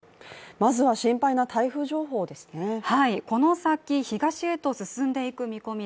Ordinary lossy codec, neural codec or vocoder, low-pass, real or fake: none; none; none; real